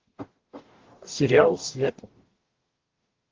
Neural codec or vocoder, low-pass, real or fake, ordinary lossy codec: codec, 44.1 kHz, 0.9 kbps, DAC; 7.2 kHz; fake; Opus, 16 kbps